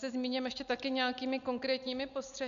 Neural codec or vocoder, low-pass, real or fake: none; 7.2 kHz; real